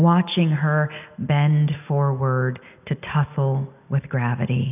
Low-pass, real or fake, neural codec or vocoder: 3.6 kHz; real; none